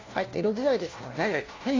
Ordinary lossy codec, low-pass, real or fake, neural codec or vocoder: AAC, 32 kbps; 7.2 kHz; fake; codec, 16 kHz, 1 kbps, FunCodec, trained on LibriTTS, 50 frames a second